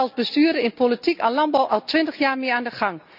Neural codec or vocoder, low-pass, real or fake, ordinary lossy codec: none; 5.4 kHz; real; none